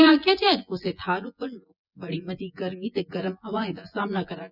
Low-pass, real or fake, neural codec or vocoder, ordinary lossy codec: 5.4 kHz; fake; vocoder, 24 kHz, 100 mel bands, Vocos; none